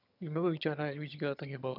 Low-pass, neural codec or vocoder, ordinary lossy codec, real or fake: 5.4 kHz; vocoder, 22.05 kHz, 80 mel bands, HiFi-GAN; none; fake